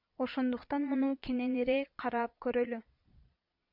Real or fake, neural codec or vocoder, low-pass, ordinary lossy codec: fake; vocoder, 22.05 kHz, 80 mel bands, Vocos; 5.4 kHz; MP3, 48 kbps